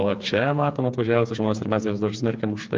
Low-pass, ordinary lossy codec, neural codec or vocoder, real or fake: 7.2 kHz; Opus, 32 kbps; codec, 16 kHz, 4 kbps, FreqCodec, smaller model; fake